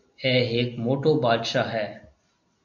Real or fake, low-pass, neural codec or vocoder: real; 7.2 kHz; none